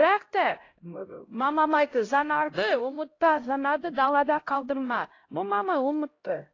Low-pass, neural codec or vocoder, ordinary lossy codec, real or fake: 7.2 kHz; codec, 16 kHz, 0.5 kbps, X-Codec, HuBERT features, trained on LibriSpeech; AAC, 32 kbps; fake